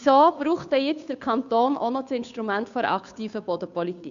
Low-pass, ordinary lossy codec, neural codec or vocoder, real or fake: 7.2 kHz; AAC, 96 kbps; codec, 16 kHz, 2 kbps, FunCodec, trained on Chinese and English, 25 frames a second; fake